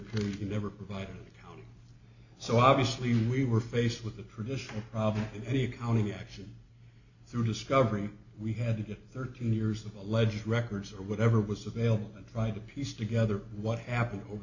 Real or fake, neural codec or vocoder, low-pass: real; none; 7.2 kHz